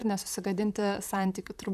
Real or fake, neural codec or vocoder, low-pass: fake; vocoder, 44.1 kHz, 128 mel bands, Pupu-Vocoder; 14.4 kHz